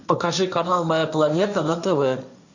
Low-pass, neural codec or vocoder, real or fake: 7.2 kHz; codec, 16 kHz, 1.1 kbps, Voila-Tokenizer; fake